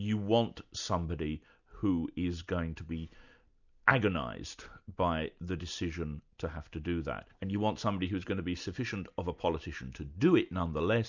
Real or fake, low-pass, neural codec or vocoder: real; 7.2 kHz; none